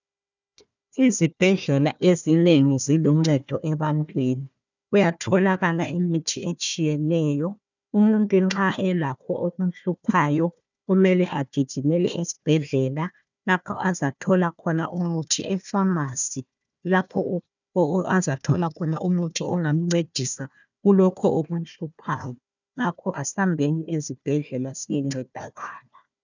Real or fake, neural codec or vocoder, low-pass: fake; codec, 16 kHz, 1 kbps, FunCodec, trained on Chinese and English, 50 frames a second; 7.2 kHz